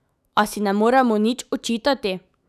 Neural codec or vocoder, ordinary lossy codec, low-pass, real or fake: autoencoder, 48 kHz, 128 numbers a frame, DAC-VAE, trained on Japanese speech; none; 14.4 kHz; fake